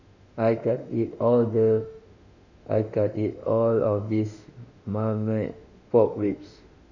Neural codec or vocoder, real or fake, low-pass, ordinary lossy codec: autoencoder, 48 kHz, 32 numbers a frame, DAC-VAE, trained on Japanese speech; fake; 7.2 kHz; none